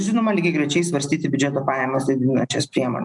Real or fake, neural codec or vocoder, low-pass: real; none; 10.8 kHz